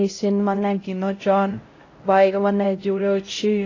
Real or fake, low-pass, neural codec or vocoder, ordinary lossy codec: fake; 7.2 kHz; codec, 16 kHz, 0.5 kbps, X-Codec, HuBERT features, trained on LibriSpeech; AAC, 32 kbps